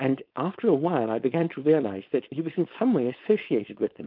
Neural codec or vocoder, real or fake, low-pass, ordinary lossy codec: codec, 16 kHz, 4.8 kbps, FACodec; fake; 5.4 kHz; AAC, 48 kbps